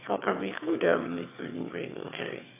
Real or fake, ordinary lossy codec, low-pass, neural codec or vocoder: fake; AAC, 24 kbps; 3.6 kHz; autoencoder, 22.05 kHz, a latent of 192 numbers a frame, VITS, trained on one speaker